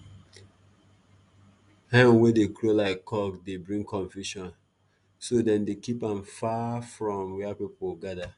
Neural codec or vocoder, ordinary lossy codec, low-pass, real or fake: none; none; 10.8 kHz; real